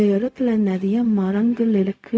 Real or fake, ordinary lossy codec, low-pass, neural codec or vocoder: fake; none; none; codec, 16 kHz, 0.4 kbps, LongCat-Audio-Codec